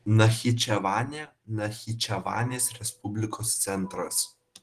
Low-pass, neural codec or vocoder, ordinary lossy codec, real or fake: 14.4 kHz; none; Opus, 16 kbps; real